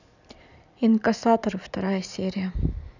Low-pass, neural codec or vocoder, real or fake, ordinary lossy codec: 7.2 kHz; vocoder, 44.1 kHz, 128 mel bands every 512 samples, BigVGAN v2; fake; none